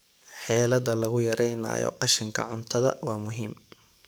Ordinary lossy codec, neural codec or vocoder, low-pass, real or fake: none; codec, 44.1 kHz, 7.8 kbps, DAC; none; fake